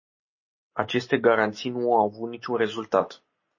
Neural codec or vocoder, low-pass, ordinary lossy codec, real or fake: none; 7.2 kHz; MP3, 32 kbps; real